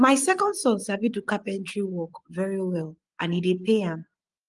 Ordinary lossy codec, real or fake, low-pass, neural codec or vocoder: Opus, 24 kbps; fake; 10.8 kHz; vocoder, 24 kHz, 100 mel bands, Vocos